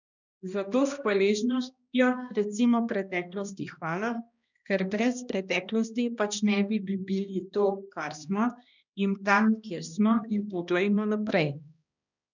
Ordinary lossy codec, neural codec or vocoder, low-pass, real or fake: none; codec, 16 kHz, 1 kbps, X-Codec, HuBERT features, trained on balanced general audio; 7.2 kHz; fake